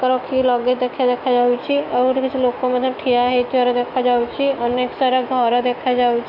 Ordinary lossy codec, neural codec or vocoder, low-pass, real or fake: none; autoencoder, 48 kHz, 128 numbers a frame, DAC-VAE, trained on Japanese speech; 5.4 kHz; fake